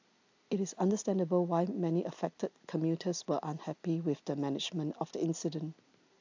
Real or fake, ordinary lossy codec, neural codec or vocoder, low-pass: real; AAC, 48 kbps; none; 7.2 kHz